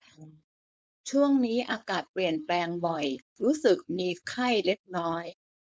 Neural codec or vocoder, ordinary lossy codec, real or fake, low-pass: codec, 16 kHz, 4.8 kbps, FACodec; none; fake; none